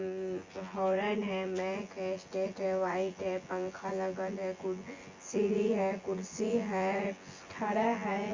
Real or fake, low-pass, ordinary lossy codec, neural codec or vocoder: fake; 7.2 kHz; Opus, 32 kbps; vocoder, 24 kHz, 100 mel bands, Vocos